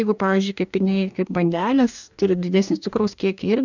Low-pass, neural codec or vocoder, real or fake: 7.2 kHz; codec, 44.1 kHz, 2.6 kbps, DAC; fake